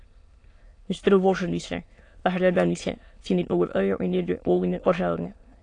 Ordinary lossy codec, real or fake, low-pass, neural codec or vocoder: AAC, 48 kbps; fake; 9.9 kHz; autoencoder, 22.05 kHz, a latent of 192 numbers a frame, VITS, trained on many speakers